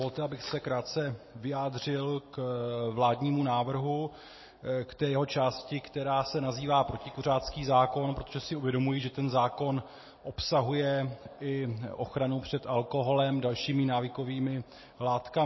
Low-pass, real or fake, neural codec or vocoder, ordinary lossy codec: 7.2 kHz; real; none; MP3, 24 kbps